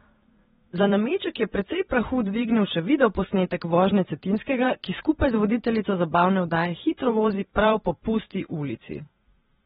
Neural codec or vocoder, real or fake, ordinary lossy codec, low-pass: vocoder, 44.1 kHz, 128 mel bands every 512 samples, BigVGAN v2; fake; AAC, 16 kbps; 19.8 kHz